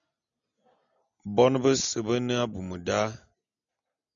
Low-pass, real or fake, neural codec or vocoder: 7.2 kHz; real; none